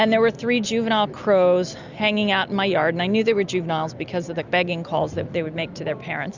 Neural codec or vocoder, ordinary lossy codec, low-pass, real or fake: none; Opus, 64 kbps; 7.2 kHz; real